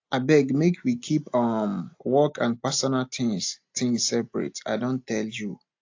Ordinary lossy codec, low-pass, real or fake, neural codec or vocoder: AAC, 48 kbps; 7.2 kHz; real; none